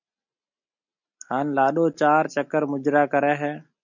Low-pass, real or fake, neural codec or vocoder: 7.2 kHz; real; none